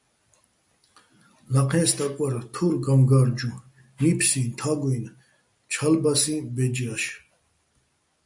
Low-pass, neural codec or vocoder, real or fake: 10.8 kHz; none; real